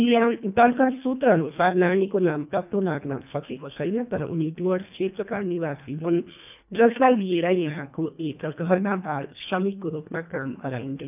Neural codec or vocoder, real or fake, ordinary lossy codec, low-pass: codec, 24 kHz, 1.5 kbps, HILCodec; fake; none; 3.6 kHz